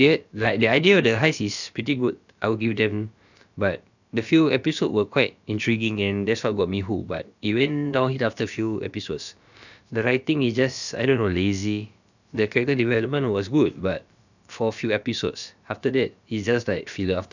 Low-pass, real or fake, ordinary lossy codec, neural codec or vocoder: 7.2 kHz; fake; none; codec, 16 kHz, about 1 kbps, DyCAST, with the encoder's durations